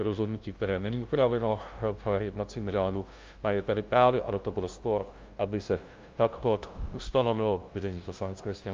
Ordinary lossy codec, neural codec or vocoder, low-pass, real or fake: Opus, 24 kbps; codec, 16 kHz, 0.5 kbps, FunCodec, trained on LibriTTS, 25 frames a second; 7.2 kHz; fake